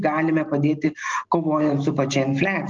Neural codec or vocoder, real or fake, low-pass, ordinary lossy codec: none; real; 7.2 kHz; Opus, 24 kbps